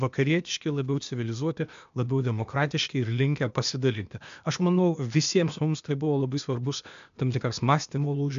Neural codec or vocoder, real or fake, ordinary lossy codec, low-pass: codec, 16 kHz, 0.8 kbps, ZipCodec; fake; MP3, 64 kbps; 7.2 kHz